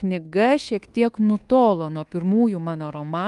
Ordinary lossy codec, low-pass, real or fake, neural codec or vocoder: Opus, 32 kbps; 10.8 kHz; fake; codec, 24 kHz, 1.2 kbps, DualCodec